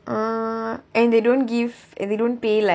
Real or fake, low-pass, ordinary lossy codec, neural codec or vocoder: real; none; none; none